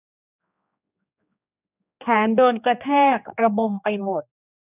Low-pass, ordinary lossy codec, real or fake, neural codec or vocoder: 3.6 kHz; none; fake; codec, 16 kHz, 2 kbps, X-Codec, HuBERT features, trained on general audio